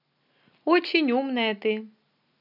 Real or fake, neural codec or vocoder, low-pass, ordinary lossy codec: real; none; 5.4 kHz; none